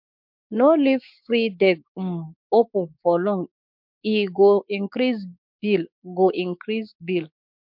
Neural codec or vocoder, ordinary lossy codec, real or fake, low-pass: codec, 16 kHz, 6 kbps, DAC; MP3, 48 kbps; fake; 5.4 kHz